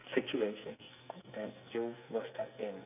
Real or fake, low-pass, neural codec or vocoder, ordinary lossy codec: fake; 3.6 kHz; codec, 44.1 kHz, 2.6 kbps, SNAC; none